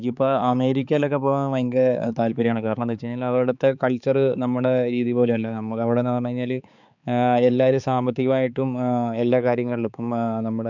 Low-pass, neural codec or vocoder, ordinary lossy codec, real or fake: 7.2 kHz; codec, 16 kHz, 4 kbps, X-Codec, HuBERT features, trained on balanced general audio; none; fake